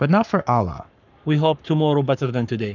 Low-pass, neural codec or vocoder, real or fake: 7.2 kHz; codec, 44.1 kHz, 7.8 kbps, Pupu-Codec; fake